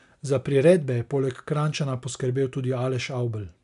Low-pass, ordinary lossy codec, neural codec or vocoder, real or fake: 10.8 kHz; none; none; real